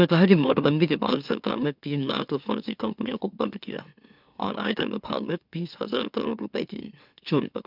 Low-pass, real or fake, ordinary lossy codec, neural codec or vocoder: 5.4 kHz; fake; none; autoencoder, 44.1 kHz, a latent of 192 numbers a frame, MeloTTS